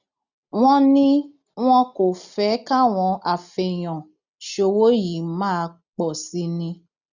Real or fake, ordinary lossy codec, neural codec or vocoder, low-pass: real; none; none; 7.2 kHz